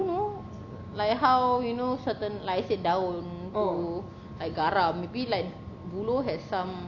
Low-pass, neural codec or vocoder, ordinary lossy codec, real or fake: 7.2 kHz; none; none; real